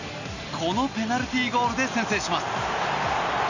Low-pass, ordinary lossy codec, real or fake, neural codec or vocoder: 7.2 kHz; none; real; none